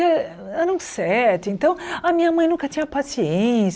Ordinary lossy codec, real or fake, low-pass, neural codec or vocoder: none; real; none; none